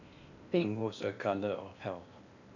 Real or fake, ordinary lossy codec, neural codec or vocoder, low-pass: fake; none; codec, 16 kHz in and 24 kHz out, 0.8 kbps, FocalCodec, streaming, 65536 codes; 7.2 kHz